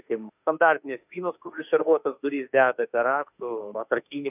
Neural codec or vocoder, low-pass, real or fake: autoencoder, 48 kHz, 32 numbers a frame, DAC-VAE, trained on Japanese speech; 3.6 kHz; fake